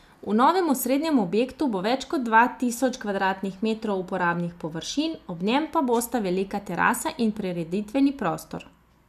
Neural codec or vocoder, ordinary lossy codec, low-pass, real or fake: none; none; 14.4 kHz; real